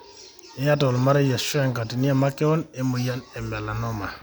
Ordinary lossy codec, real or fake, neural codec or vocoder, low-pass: none; real; none; none